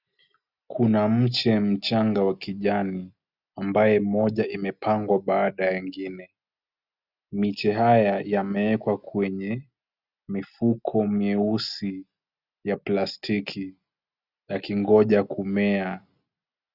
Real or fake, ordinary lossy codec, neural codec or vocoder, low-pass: real; Opus, 64 kbps; none; 5.4 kHz